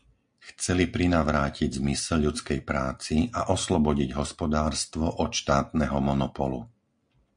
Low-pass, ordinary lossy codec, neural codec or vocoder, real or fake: 9.9 kHz; MP3, 64 kbps; none; real